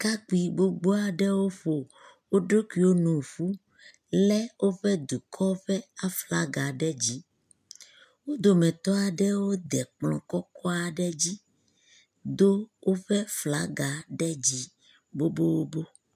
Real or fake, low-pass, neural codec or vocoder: real; 14.4 kHz; none